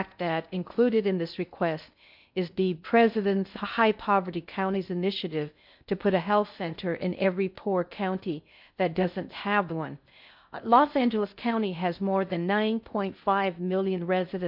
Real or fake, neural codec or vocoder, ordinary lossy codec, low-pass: fake; codec, 16 kHz in and 24 kHz out, 0.6 kbps, FocalCodec, streaming, 2048 codes; MP3, 48 kbps; 5.4 kHz